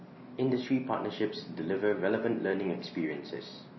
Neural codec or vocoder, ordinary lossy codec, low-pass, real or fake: none; MP3, 24 kbps; 7.2 kHz; real